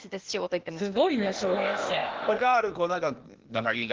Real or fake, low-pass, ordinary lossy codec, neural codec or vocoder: fake; 7.2 kHz; Opus, 16 kbps; codec, 16 kHz, 0.8 kbps, ZipCodec